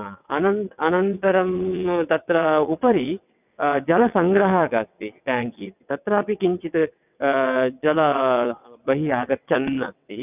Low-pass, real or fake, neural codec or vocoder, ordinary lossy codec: 3.6 kHz; fake; vocoder, 22.05 kHz, 80 mel bands, WaveNeXt; none